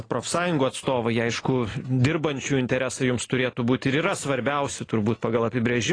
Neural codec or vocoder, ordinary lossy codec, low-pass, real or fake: none; AAC, 32 kbps; 9.9 kHz; real